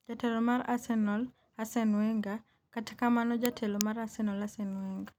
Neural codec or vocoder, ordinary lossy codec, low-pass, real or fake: none; none; 19.8 kHz; real